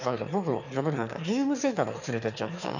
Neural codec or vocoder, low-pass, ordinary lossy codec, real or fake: autoencoder, 22.05 kHz, a latent of 192 numbers a frame, VITS, trained on one speaker; 7.2 kHz; none; fake